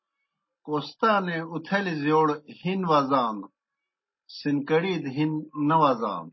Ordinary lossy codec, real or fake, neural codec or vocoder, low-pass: MP3, 24 kbps; real; none; 7.2 kHz